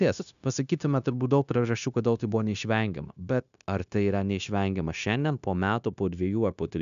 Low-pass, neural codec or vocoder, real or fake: 7.2 kHz; codec, 16 kHz, 0.9 kbps, LongCat-Audio-Codec; fake